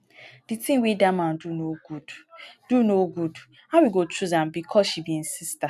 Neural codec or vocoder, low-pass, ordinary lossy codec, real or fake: none; 14.4 kHz; none; real